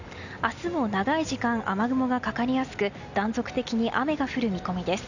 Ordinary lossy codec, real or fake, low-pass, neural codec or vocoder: none; real; 7.2 kHz; none